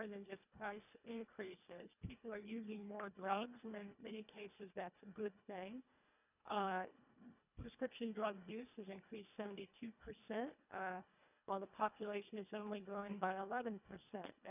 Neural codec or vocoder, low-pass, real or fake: codec, 24 kHz, 1.5 kbps, HILCodec; 3.6 kHz; fake